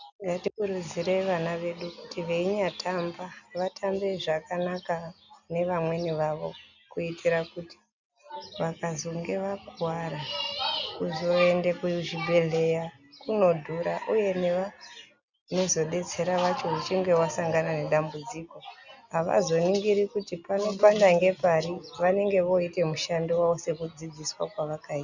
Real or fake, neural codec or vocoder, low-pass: real; none; 7.2 kHz